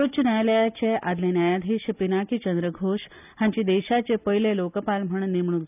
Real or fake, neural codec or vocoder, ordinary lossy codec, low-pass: real; none; none; 3.6 kHz